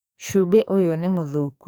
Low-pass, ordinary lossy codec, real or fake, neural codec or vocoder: none; none; fake; codec, 44.1 kHz, 2.6 kbps, SNAC